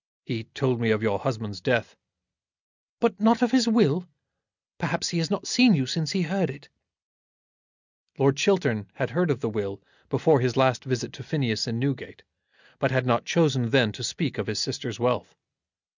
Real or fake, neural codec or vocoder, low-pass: real; none; 7.2 kHz